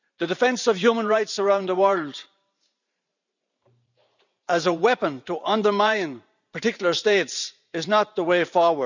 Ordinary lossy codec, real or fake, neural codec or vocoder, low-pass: none; fake; vocoder, 44.1 kHz, 128 mel bands every 512 samples, BigVGAN v2; 7.2 kHz